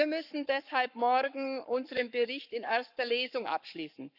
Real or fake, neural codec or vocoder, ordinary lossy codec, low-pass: fake; codec, 44.1 kHz, 7.8 kbps, Pupu-Codec; none; 5.4 kHz